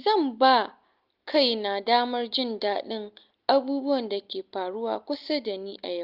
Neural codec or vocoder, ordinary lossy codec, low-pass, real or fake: none; Opus, 32 kbps; 5.4 kHz; real